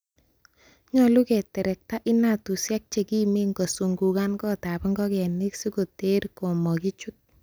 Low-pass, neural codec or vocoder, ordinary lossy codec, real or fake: none; none; none; real